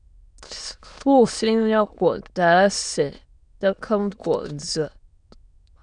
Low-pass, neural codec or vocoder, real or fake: 9.9 kHz; autoencoder, 22.05 kHz, a latent of 192 numbers a frame, VITS, trained on many speakers; fake